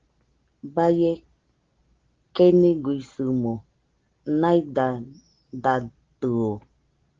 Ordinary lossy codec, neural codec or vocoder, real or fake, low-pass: Opus, 16 kbps; none; real; 7.2 kHz